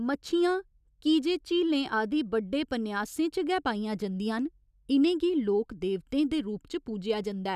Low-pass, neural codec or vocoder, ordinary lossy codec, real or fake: 14.4 kHz; none; none; real